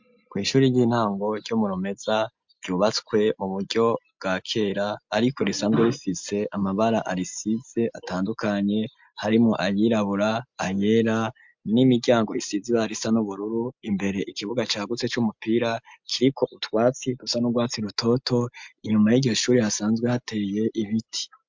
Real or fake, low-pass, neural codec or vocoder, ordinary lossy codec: real; 7.2 kHz; none; MP3, 64 kbps